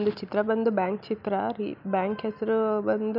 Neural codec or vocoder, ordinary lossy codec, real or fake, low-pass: none; none; real; 5.4 kHz